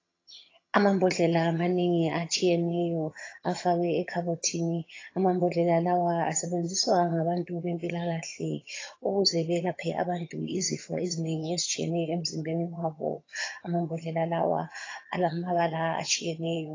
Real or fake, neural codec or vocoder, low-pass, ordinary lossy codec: fake; vocoder, 22.05 kHz, 80 mel bands, HiFi-GAN; 7.2 kHz; AAC, 32 kbps